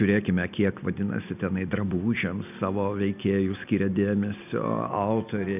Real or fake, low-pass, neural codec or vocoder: real; 3.6 kHz; none